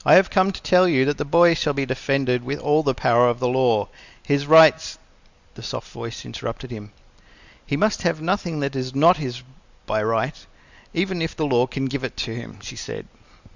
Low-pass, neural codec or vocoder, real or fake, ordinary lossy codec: 7.2 kHz; none; real; Opus, 64 kbps